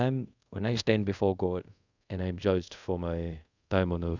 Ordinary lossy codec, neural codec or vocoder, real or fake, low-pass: none; codec, 24 kHz, 0.5 kbps, DualCodec; fake; 7.2 kHz